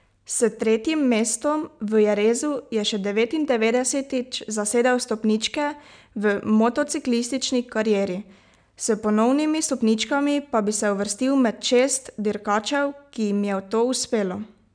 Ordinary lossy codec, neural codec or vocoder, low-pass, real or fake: none; none; 9.9 kHz; real